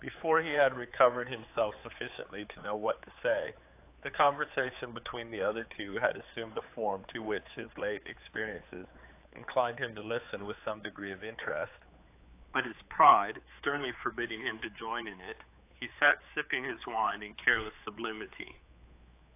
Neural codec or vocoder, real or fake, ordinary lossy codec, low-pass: codec, 16 kHz, 4 kbps, X-Codec, HuBERT features, trained on general audio; fake; AAC, 24 kbps; 3.6 kHz